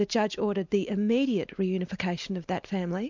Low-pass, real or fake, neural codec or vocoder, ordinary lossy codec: 7.2 kHz; real; none; MP3, 64 kbps